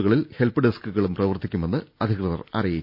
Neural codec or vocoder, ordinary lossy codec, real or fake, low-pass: none; none; real; 5.4 kHz